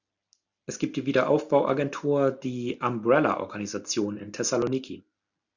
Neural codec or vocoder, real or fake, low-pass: none; real; 7.2 kHz